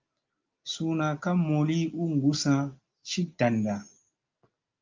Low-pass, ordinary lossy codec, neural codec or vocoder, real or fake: 7.2 kHz; Opus, 24 kbps; none; real